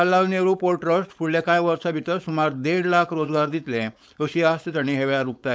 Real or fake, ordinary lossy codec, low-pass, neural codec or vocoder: fake; none; none; codec, 16 kHz, 4.8 kbps, FACodec